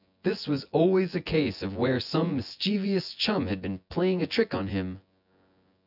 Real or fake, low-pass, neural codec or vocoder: fake; 5.4 kHz; vocoder, 24 kHz, 100 mel bands, Vocos